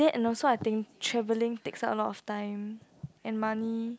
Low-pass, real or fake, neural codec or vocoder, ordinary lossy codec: none; real; none; none